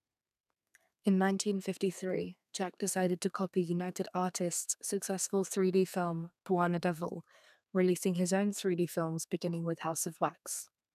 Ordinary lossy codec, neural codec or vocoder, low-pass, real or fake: none; codec, 32 kHz, 1.9 kbps, SNAC; 14.4 kHz; fake